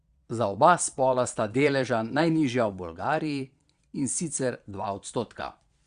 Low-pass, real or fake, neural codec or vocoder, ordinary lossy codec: 9.9 kHz; fake; vocoder, 22.05 kHz, 80 mel bands, Vocos; Opus, 64 kbps